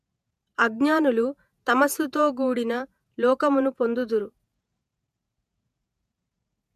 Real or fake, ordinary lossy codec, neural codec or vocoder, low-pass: fake; MP3, 96 kbps; vocoder, 48 kHz, 128 mel bands, Vocos; 14.4 kHz